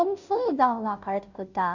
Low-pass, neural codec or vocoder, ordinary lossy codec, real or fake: 7.2 kHz; codec, 16 kHz, 0.5 kbps, FunCodec, trained on Chinese and English, 25 frames a second; none; fake